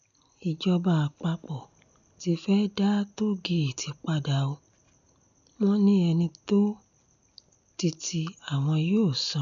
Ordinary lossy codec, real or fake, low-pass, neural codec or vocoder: none; real; 7.2 kHz; none